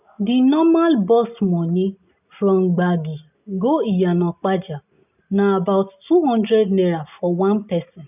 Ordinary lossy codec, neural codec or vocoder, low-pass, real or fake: none; none; 3.6 kHz; real